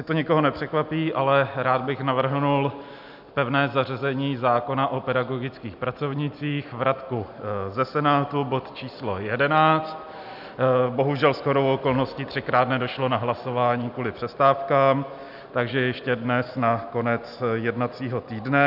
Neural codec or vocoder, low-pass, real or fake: none; 5.4 kHz; real